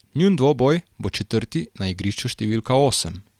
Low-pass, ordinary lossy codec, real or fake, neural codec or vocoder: 19.8 kHz; Opus, 24 kbps; real; none